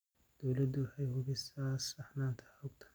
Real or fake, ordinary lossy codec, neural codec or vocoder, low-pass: real; none; none; none